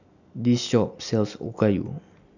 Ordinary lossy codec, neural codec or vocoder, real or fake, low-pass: none; none; real; 7.2 kHz